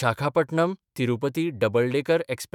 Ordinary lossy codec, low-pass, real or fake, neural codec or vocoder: none; 19.8 kHz; fake; autoencoder, 48 kHz, 128 numbers a frame, DAC-VAE, trained on Japanese speech